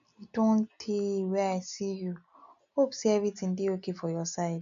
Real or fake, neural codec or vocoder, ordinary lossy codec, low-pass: real; none; none; 7.2 kHz